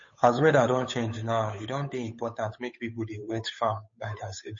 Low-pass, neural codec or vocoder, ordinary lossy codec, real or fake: 7.2 kHz; codec, 16 kHz, 8 kbps, FunCodec, trained on Chinese and English, 25 frames a second; MP3, 32 kbps; fake